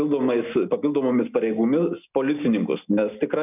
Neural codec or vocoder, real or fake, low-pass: none; real; 3.6 kHz